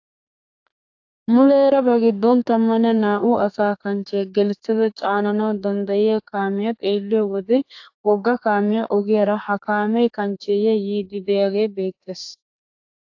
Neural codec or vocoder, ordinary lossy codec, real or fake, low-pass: codec, 32 kHz, 1.9 kbps, SNAC; AAC, 48 kbps; fake; 7.2 kHz